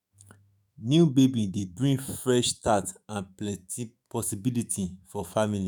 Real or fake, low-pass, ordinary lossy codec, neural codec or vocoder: fake; none; none; autoencoder, 48 kHz, 128 numbers a frame, DAC-VAE, trained on Japanese speech